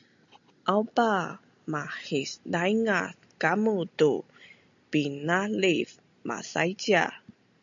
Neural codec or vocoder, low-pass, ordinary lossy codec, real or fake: none; 7.2 kHz; MP3, 64 kbps; real